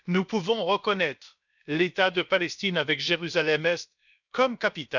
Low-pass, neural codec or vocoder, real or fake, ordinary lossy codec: 7.2 kHz; codec, 16 kHz, about 1 kbps, DyCAST, with the encoder's durations; fake; none